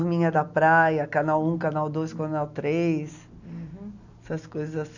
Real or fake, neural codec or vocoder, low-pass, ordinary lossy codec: real; none; 7.2 kHz; none